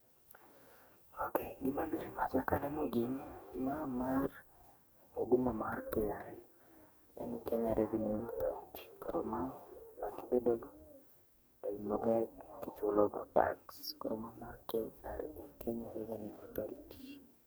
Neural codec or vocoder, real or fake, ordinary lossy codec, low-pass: codec, 44.1 kHz, 2.6 kbps, DAC; fake; none; none